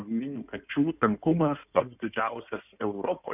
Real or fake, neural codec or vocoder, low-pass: fake; codec, 16 kHz in and 24 kHz out, 2.2 kbps, FireRedTTS-2 codec; 3.6 kHz